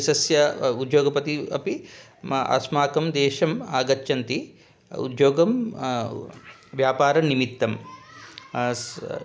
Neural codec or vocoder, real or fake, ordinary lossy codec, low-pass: none; real; none; none